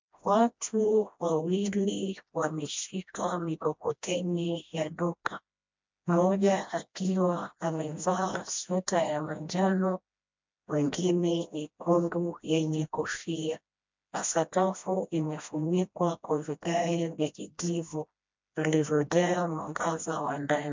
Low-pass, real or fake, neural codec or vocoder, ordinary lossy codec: 7.2 kHz; fake; codec, 16 kHz, 1 kbps, FreqCodec, smaller model; MP3, 64 kbps